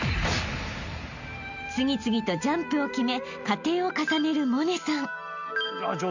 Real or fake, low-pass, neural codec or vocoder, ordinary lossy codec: real; 7.2 kHz; none; none